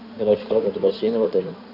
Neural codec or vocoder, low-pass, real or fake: codec, 16 kHz in and 24 kHz out, 2.2 kbps, FireRedTTS-2 codec; 5.4 kHz; fake